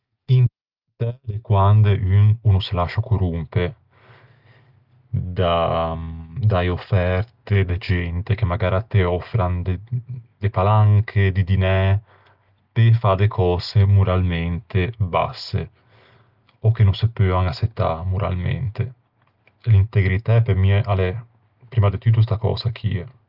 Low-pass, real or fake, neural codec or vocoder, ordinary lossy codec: 5.4 kHz; real; none; Opus, 32 kbps